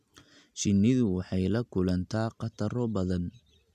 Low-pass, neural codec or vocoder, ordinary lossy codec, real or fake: 14.4 kHz; none; none; real